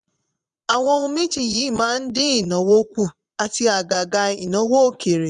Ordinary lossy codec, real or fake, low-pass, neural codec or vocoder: none; fake; 9.9 kHz; vocoder, 22.05 kHz, 80 mel bands, Vocos